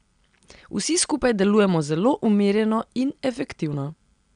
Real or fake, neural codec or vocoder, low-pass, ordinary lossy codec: real; none; 9.9 kHz; none